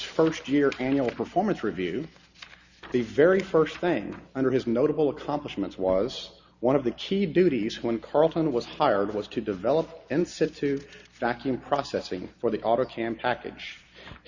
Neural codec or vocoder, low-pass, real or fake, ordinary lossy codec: none; 7.2 kHz; real; Opus, 64 kbps